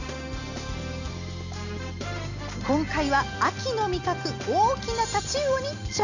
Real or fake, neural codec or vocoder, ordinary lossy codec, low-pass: real; none; none; 7.2 kHz